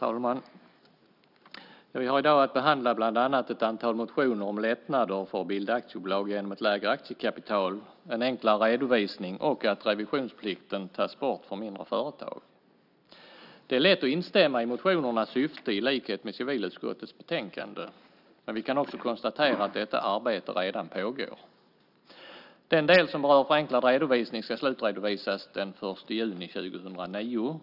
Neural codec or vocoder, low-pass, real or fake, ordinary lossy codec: none; 5.4 kHz; real; none